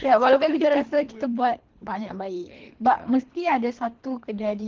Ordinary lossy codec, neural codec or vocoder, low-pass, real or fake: Opus, 16 kbps; codec, 24 kHz, 3 kbps, HILCodec; 7.2 kHz; fake